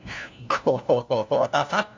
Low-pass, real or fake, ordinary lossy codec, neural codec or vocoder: 7.2 kHz; fake; MP3, 64 kbps; codec, 16 kHz, 1 kbps, FunCodec, trained on LibriTTS, 50 frames a second